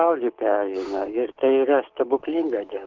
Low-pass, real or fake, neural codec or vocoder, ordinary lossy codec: 7.2 kHz; fake; codec, 16 kHz, 6 kbps, DAC; Opus, 16 kbps